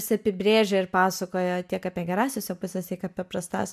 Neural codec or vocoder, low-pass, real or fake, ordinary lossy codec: none; 14.4 kHz; real; MP3, 96 kbps